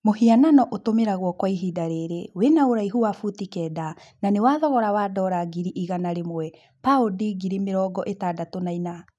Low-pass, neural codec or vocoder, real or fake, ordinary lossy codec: none; none; real; none